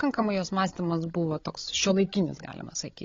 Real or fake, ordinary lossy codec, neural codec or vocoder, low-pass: fake; AAC, 32 kbps; codec, 16 kHz, 16 kbps, FreqCodec, larger model; 7.2 kHz